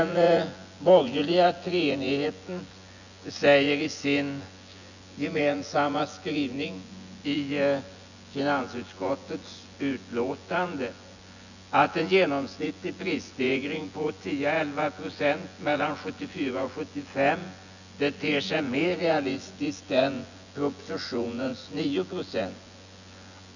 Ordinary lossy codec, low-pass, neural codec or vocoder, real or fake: none; 7.2 kHz; vocoder, 24 kHz, 100 mel bands, Vocos; fake